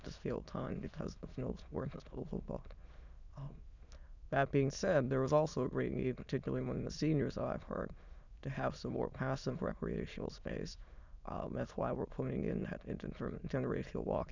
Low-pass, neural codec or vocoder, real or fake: 7.2 kHz; autoencoder, 22.05 kHz, a latent of 192 numbers a frame, VITS, trained on many speakers; fake